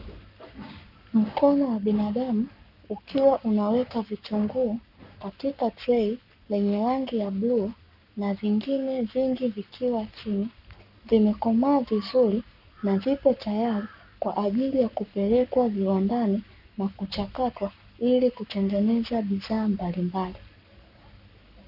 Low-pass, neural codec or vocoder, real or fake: 5.4 kHz; codec, 44.1 kHz, 7.8 kbps, Pupu-Codec; fake